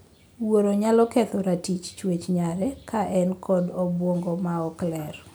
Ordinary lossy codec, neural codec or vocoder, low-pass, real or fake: none; none; none; real